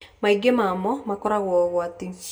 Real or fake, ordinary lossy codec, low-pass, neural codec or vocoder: real; none; none; none